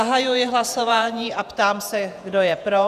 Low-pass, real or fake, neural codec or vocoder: 14.4 kHz; fake; vocoder, 48 kHz, 128 mel bands, Vocos